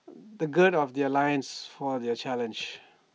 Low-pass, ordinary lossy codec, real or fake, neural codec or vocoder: none; none; real; none